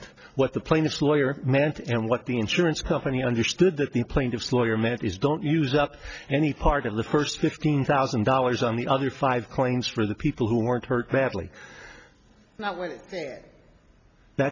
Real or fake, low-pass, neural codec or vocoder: real; 7.2 kHz; none